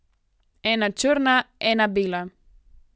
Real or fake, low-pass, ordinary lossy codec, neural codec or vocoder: real; none; none; none